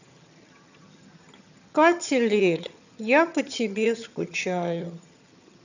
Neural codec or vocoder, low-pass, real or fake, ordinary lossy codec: vocoder, 22.05 kHz, 80 mel bands, HiFi-GAN; 7.2 kHz; fake; none